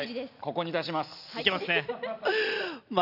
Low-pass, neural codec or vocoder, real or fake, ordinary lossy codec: 5.4 kHz; none; real; none